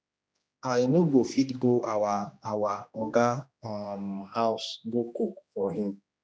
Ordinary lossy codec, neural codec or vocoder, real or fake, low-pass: none; codec, 16 kHz, 1 kbps, X-Codec, HuBERT features, trained on general audio; fake; none